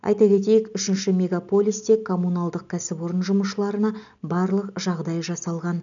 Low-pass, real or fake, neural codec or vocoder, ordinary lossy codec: 7.2 kHz; real; none; none